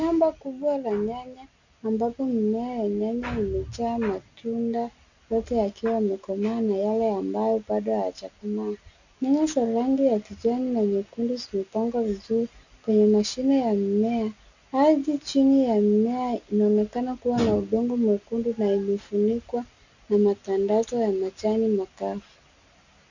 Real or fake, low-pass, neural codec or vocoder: real; 7.2 kHz; none